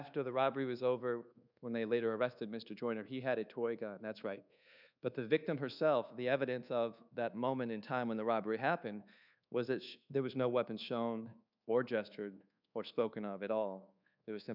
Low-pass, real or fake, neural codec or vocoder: 5.4 kHz; fake; codec, 24 kHz, 1.2 kbps, DualCodec